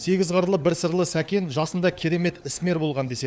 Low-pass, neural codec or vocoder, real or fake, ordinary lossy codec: none; codec, 16 kHz, 4 kbps, FunCodec, trained on LibriTTS, 50 frames a second; fake; none